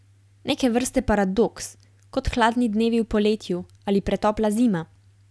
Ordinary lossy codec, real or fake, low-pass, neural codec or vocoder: none; real; none; none